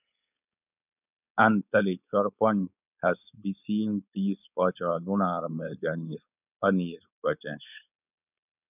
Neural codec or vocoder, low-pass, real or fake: codec, 16 kHz, 4.8 kbps, FACodec; 3.6 kHz; fake